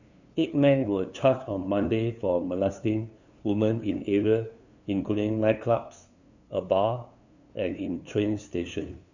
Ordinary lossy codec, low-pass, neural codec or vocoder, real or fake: none; 7.2 kHz; codec, 16 kHz, 2 kbps, FunCodec, trained on LibriTTS, 25 frames a second; fake